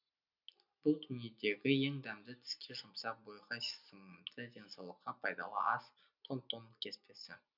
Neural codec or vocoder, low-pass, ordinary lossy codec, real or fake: none; 5.4 kHz; none; real